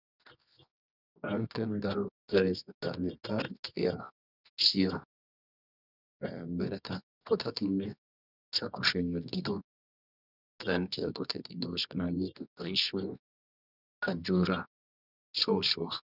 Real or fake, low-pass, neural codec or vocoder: fake; 5.4 kHz; codec, 24 kHz, 0.9 kbps, WavTokenizer, medium music audio release